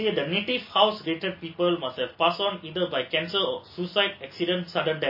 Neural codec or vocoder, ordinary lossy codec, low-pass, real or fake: none; MP3, 24 kbps; 5.4 kHz; real